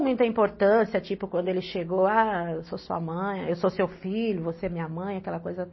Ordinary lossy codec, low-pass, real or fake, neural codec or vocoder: MP3, 24 kbps; 7.2 kHz; real; none